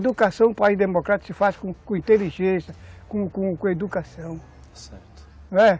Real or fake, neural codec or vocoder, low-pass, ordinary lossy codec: real; none; none; none